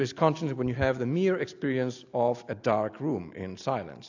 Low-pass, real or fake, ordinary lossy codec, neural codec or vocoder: 7.2 kHz; real; AAC, 48 kbps; none